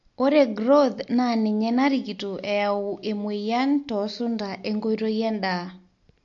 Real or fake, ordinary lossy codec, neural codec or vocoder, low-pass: real; MP3, 48 kbps; none; 7.2 kHz